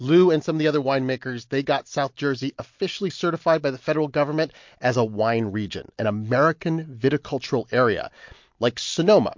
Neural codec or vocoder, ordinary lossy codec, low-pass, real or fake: none; MP3, 48 kbps; 7.2 kHz; real